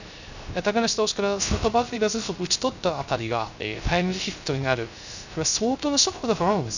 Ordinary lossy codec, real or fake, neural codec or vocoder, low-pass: none; fake; codec, 16 kHz, 0.3 kbps, FocalCodec; 7.2 kHz